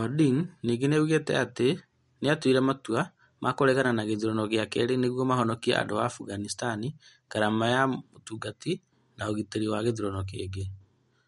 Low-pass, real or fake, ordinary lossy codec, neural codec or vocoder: 19.8 kHz; real; MP3, 48 kbps; none